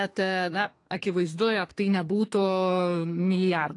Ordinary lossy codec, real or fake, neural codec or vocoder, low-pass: AAC, 48 kbps; fake; codec, 32 kHz, 1.9 kbps, SNAC; 10.8 kHz